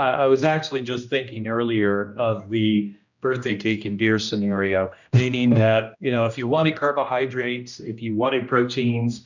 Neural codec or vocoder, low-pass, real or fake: codec, 16 kHz, 1 kbps, X-Codec, HuBERT features, trained on general audio; 7.2 kHz; fake